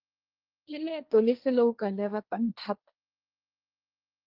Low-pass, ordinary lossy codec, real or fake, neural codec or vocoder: 5.4 kHz; Opus, 32 kbps; fake; codec, 16 kHz, 1.1 kbps, Voila-Tokenizer